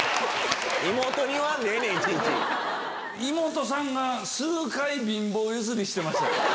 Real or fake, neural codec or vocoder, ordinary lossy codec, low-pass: real; none; none; none